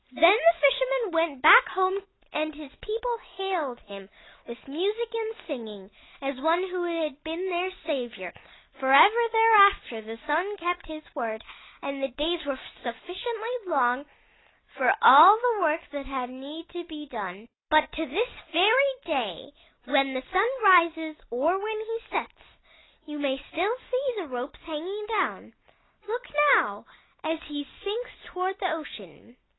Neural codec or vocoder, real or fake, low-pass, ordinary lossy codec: none; real; 7.2 kHz; AAC, 16 kbps